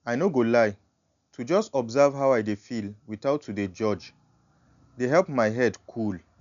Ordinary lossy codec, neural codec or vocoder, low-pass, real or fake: none; none; 7.2 kHz; real